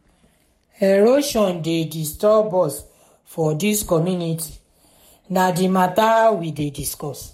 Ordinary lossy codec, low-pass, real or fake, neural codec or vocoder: MP3, 64 kbps; 19.8 kHz; fake; codec, 44.1 kHz, 7.8 kbps, Pupu-Codec